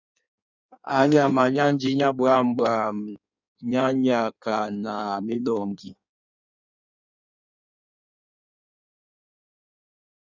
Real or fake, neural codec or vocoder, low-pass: fake; codec, 16 kHz in and 24 kHz out, 1.1 kbps, FireRedTTS-2 codec; 7.2 kHz